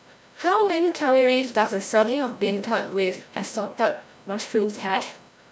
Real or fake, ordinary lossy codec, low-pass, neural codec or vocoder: fake; none; none; codec, 16 kHz, 0.5 kbps, FreqCodec, larger model